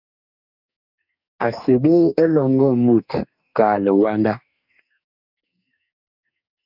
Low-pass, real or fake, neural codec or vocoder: 5.4 kHz; fake; codec, 44.1 kHz, 2.6 kbps, DAC